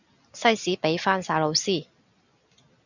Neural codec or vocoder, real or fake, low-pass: none; real; 7.2 kHz